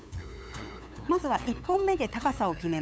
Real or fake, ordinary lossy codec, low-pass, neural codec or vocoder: fake; none; none; codec, 16 kHz, 8 kbps, FunCodec, trained on LibriTTS, 25 frames a second